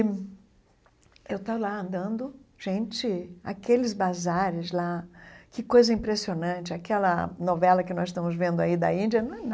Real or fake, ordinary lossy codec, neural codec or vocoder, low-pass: real; none; none; none